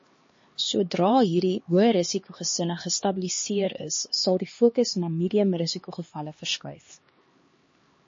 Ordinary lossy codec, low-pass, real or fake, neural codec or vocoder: MP3, 32 kbps; 7.2 kHz; fake; codec, 16 kHz, 2 kbps, X-Codec, HuBERT features, trained on LibriSpeech